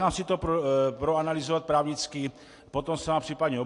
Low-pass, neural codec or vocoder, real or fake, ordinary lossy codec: 10.8 kHz; none; real; AAC, 48 kbps